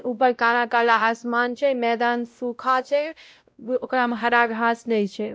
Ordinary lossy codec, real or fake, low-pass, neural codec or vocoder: none; fake; none; codec, 16 kHz, 0.5 kbps, X-Codec, WavLM features, trained on Multilingual LibriSpeech